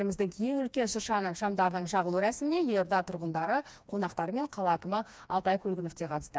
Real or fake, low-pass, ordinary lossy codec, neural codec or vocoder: fake; none; none; codec, 16 kHz, 2 kbps, FreqCodec, smaller model